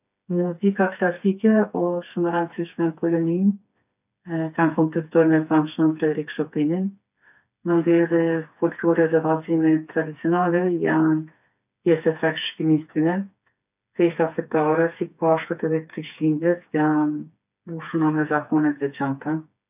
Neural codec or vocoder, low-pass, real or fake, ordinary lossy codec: codec, 16 kHz, 4 kbps, FreqCodec, smaller model; 3.6 kHz; fake; none